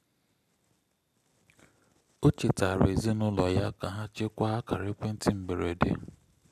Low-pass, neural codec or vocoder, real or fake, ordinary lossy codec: 14.4 kHz; none; real; none